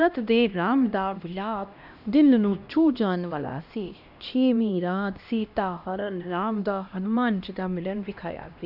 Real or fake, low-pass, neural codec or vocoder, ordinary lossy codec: fake; 5.4 kHz; codec, 16 kHz, 1 kbps, X-Codec, HuBERT features, trained on LibriSpeech; none